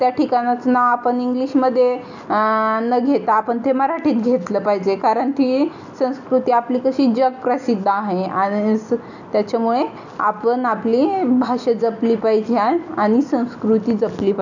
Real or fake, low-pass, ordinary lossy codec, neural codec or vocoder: real; 7.2 kHz; none; none